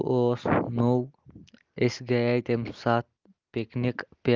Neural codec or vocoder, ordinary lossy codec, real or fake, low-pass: none; Opus, 24 kbps; real; 7.2 kHz